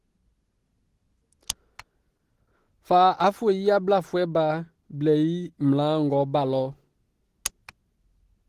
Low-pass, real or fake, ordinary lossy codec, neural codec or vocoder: 14.4 kHz; real; Opus, 16 kbps; none